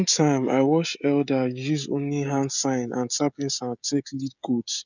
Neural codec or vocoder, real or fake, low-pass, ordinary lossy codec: codec, 16 kHz, 16 kbps, FreqCodec, smaller model; fake; 7.2 kHz; none